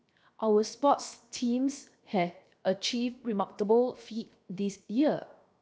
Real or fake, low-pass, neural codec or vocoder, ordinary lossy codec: fake; none; codec, 16 kHz, 0.7 kbps, FocalCodec; none